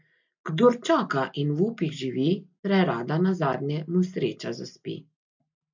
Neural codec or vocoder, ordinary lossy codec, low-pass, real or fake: none; MP3, 64 kbps; 7.2 kHz; real